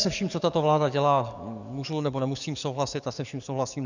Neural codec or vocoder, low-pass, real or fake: codec, 44.1 kHz, 7.8 kbps, DAC; 7.2 kHz; fake